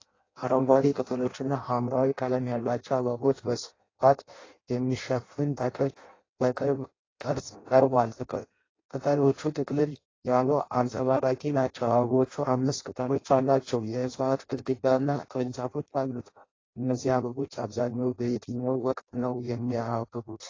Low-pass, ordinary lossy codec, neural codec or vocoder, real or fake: 7.2 kHz; AAC, 32 kbps; codec, 16 kHz in and 24 kHz out, 0.6 kbps, FireRedTTS-2 codec; fake